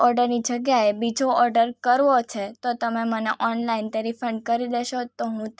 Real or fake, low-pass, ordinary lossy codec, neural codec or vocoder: real; none; none; none